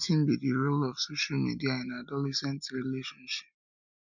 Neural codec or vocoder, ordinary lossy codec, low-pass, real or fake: none; none; 7.2 kHz; real